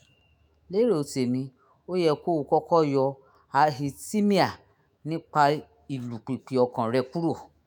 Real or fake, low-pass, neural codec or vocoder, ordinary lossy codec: fake; none; autoencoder, 48 kHz, 128 numbers a frame, DAC-VAE, trained on Japanese speech; none